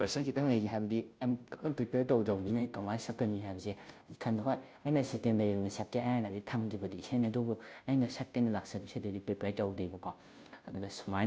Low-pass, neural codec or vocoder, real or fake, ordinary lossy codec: none; codec, 16 kHz, 0.5 kbps, FunCodec, trained on Chinese and English, 25 frames a second; fake; none